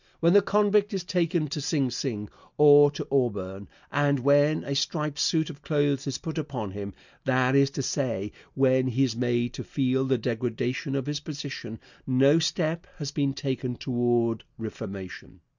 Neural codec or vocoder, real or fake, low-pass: none; real; 7.2 kHz